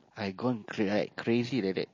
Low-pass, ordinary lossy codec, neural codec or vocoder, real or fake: 7.2 kHz; MP3, 32 kbps; none; real